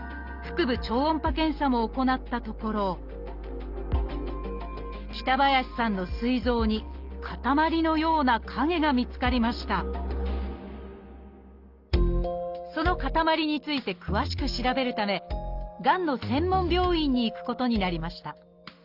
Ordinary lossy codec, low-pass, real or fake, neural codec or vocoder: Opus, 32 kbps; 5.4 kHz; real; none